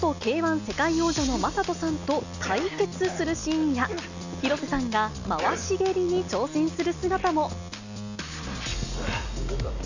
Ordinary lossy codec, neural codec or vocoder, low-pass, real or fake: none; none; 7.2 kHz; real